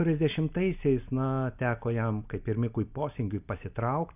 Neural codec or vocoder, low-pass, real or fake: none; 3.6 kHz; real